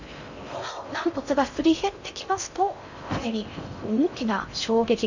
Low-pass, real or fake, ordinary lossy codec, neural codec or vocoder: 7.2 kHz; fake; none; codec, 16 kHz in and 24 kHz out, 0.6 kbps, FocalCodec, streaming, 4096 codes